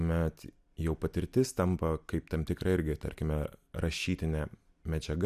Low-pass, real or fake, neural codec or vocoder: 14.4 kHz; real; none